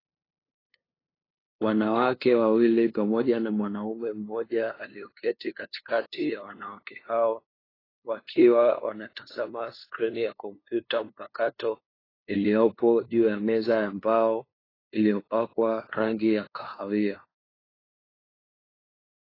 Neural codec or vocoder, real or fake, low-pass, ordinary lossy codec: codec, 16 kHz, 2 kbps, FunCodec, trained on LibriTTS, 25 frames a second; fake; 5.4 kHz; AAC, 24 kbps